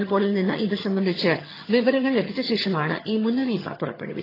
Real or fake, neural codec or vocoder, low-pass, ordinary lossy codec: fake; vocoder, 22.05 kHz, 80 mel bands, HiFi-GAN; 5.4 kHz; AAC, 24 kbps